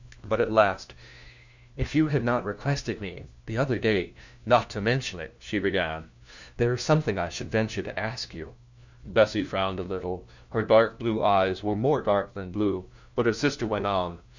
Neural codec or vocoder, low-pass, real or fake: codec, 16 kHz, 1 kbps, FunCodec, trained on LibriTTS, 50 frames a second; 7.2 kHz; fake